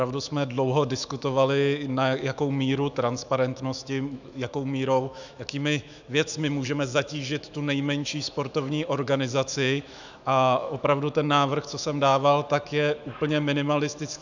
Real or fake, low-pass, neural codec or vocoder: fake; 7.2 kHz; autoencoder, 48 kHz, 128 numbers a frame, DAC-VAE, trained on Japanese speech